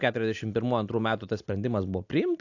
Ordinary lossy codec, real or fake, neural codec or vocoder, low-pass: AAC, 48 kbps; real; none; 7.2 kHz